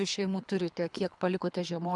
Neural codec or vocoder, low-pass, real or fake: codec, 24 kHz, 3 kbps, HILCodec; 10.8 kHz; fake